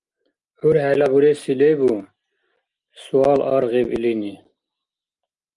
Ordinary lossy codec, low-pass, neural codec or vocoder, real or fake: Opus, 32 kbps; 10.8 kHz; none; real